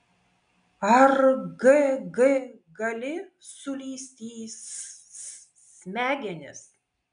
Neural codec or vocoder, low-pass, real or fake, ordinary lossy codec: none; 9.9 kHz; real; MP3, 96 kbps